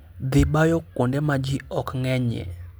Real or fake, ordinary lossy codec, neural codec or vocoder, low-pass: real; none; none; none